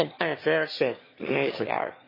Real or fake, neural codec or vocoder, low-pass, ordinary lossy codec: fake; autoencoder, 22.05 kHz, a latent of 192 numbers a frame, VITS, trained on one speaker; 5.4 kHz; MP3, 24 kbps